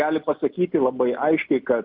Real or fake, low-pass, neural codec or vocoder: real; 5.4 kHz; none